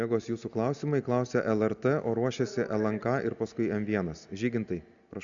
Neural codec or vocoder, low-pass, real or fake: none; 7.2 kHz; real